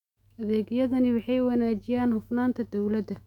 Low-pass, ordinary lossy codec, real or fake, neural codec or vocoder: 19.8 kHz; MP3, 96 kbps; fake; autoencoder, 48 kHz, 128 numbers a frame, DAC-VAE, trained on Japanese speech